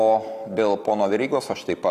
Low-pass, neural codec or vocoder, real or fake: 14.4 kHz; none; real